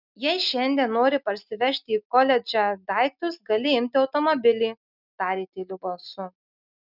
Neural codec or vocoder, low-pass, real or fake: none; 5.4 kHz; real